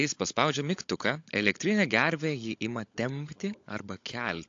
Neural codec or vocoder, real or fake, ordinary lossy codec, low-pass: codec, 16 kHz, 16 kbps, FunCodec, trained on LibriTTS, 50 frames a second; fake; AAC, 48 kbps; 7.2 kHz